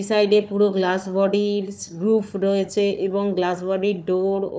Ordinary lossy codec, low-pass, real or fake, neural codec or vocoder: none; none; fake; codec, 16 kHz, 4 kbps, FunCodec, trained on Chinese and English, 50 frames a second